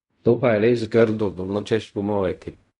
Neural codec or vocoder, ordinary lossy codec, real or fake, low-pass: codec, 16 kHz in and 24 kHz out, 0.4 kbps, LongCat-Audio-Codec, fine tuned four codebook decoder; none; fake; 10.8 kHz